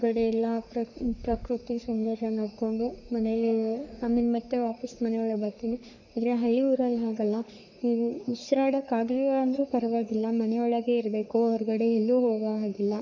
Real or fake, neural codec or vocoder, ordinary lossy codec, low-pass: fake; codec, 44.1 kHz, 3.4 kbps, Pupu-Codec; none; 7.2 kHz